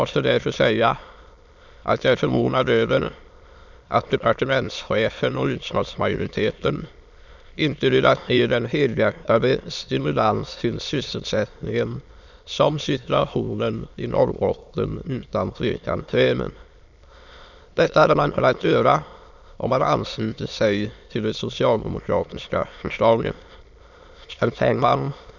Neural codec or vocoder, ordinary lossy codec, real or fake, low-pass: autoencoder, 22.05 kHz, a latent of 192 numbers a frame, VITS, trained on many speakers; none; fake; 7.2 kHz